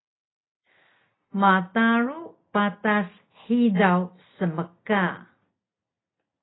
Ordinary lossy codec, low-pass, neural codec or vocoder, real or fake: AAC, 16 kbps; 7.2 kHz; none; real